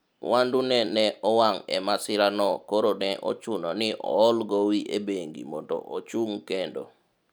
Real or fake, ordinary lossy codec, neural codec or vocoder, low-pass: real; none; none; none